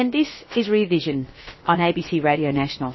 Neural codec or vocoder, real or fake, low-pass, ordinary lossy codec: codec, 16 kHz, about 1 kbps, DyCAST, with the encoder's durations; fake; 7.2 kHz; MP3, 24 kbps